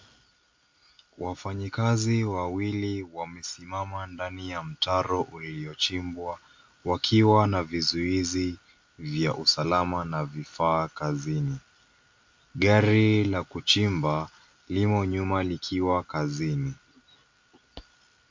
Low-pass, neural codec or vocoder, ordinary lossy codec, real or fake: 7.2 kHz; none; MP3, 48 kbps; real